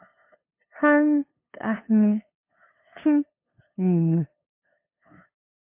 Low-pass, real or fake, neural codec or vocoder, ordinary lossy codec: 3.6 kHz; fake; codec, 16 kHz, 2 kbps, FunCodec, trained on LibriTTS, 25 frames a second; MP3, 24 kbps